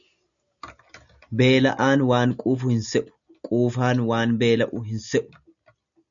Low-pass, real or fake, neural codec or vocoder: 7.2 kHz; real; none